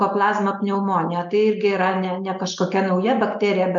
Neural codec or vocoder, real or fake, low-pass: none; real; 7.2 kHz